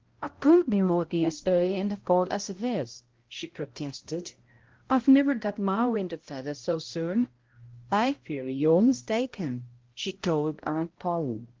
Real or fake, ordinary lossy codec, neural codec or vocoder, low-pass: fake; Opus, 16 kbps; codec, 16 kHz, 0.5 kbps, X-Codec, HuBERT features, trained on balanced general audio; 7.2 kHz